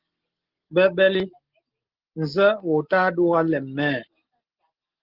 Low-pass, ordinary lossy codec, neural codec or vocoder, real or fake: 5.4 kHz; Opus, 16 kbps; none; real